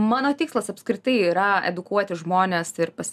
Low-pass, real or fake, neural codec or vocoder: 14.4 kHz; real; none